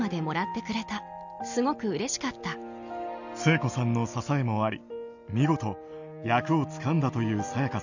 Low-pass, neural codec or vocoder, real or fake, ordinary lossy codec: 7.2 kHz; none; real; none